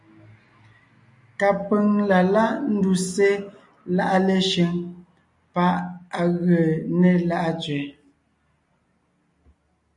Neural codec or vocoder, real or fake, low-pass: none; real; 10.8 kHz